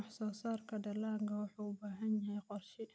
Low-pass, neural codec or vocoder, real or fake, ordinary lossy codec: none; none; real; none